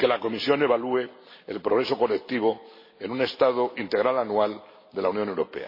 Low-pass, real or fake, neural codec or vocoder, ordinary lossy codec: 5.4 kHz; real; none; MP3, 24 kbps